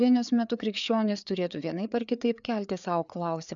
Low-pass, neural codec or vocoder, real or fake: 7.2 kHz; codec, 16 kHz, 4 kbps, FreqCodec, larger model; fake